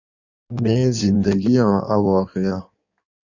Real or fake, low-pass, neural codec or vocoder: fake; 7.2 kHz; codec, 16 kHz in and 24 kHz out, 1.1 kbps, FireRedTTS-2 codec